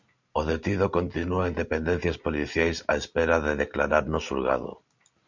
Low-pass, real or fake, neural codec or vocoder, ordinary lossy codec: 7.2 kHz; real; none; MP3, 64 kbps